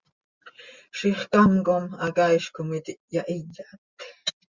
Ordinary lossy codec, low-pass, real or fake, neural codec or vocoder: Opus, 64 kbps; 7.2 kHz; real; none